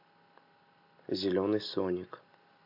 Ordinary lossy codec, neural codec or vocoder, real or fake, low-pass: AAC, 48 kbps; none; real; 5.4 kHz